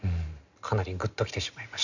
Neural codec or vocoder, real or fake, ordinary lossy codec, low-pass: none; real; none; 7.2 kHz